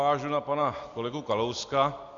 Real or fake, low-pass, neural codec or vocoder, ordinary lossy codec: real; 7.2 kHz; none; AAC, 48 kbps